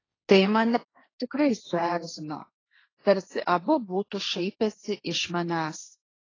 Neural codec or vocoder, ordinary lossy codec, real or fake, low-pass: codec, 16 kHz, 1.1 kbps, Voila-Tokenizer; AAC, 32 kbps; fake; 7.2 kHz